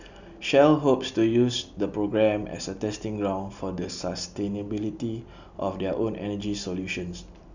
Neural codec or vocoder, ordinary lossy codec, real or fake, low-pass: none; none; real; 7.2 kHz